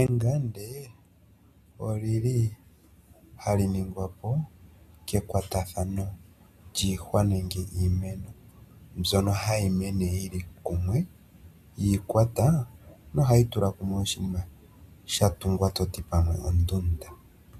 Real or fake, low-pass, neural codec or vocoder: real; 14.4 kHz; none